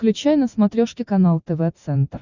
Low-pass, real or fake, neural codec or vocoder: 7.2 kHz; real; none